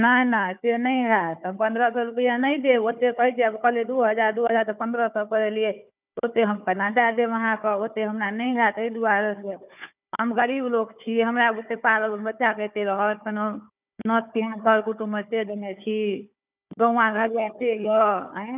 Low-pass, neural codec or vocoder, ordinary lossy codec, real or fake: 3.6 kHz; codec, 16 kHz, 4 kbps, FunCodec, trained on Chinese and English, 50 frames a second; none; fake